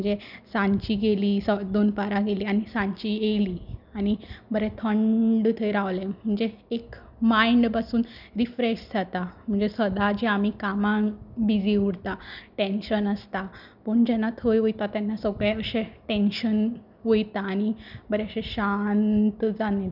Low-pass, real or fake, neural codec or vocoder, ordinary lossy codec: 5.4 kHz; real; none; none